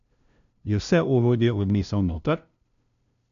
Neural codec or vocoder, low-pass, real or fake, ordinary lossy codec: codec, 16 kHz, 0.5 kbps, FunCodec, trained on LibriTTS, 25 frames a second; 7.2 kHz; fake; none